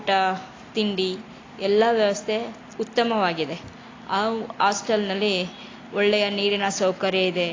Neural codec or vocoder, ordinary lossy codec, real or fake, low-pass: none; AAC, 32 kbps; real; 7.2 kHz